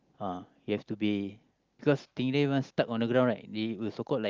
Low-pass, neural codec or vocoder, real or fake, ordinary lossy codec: 7.2 kHz; none; real; Opus, 32 kbps